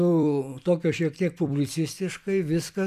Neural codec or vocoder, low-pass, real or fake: vocoder, 44.1 kHz, 128 mel bands every 256 samples, BigVGAN v2; 14.4 kHz; fake